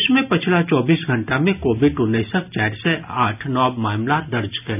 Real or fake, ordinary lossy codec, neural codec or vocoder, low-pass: real; none; none; 3.6 kHz